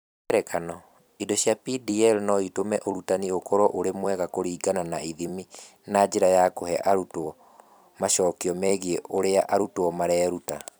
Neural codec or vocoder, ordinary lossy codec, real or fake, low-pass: vocoder, 44.1 kHz, 128 mel bands every 256 samples, BigVGAN v2; none; fake; none